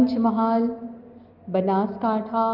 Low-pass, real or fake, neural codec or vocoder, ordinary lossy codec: 5.4 kHz; real; none; Opus, 24 kbps